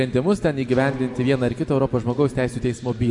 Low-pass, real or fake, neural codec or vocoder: 10.8 kHz; real; none